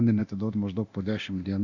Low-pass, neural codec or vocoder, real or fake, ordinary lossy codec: 7.2 kHz; codec, 24 kHz, 0.9 kbps, DualCodec; fake; Opus, 64 kbps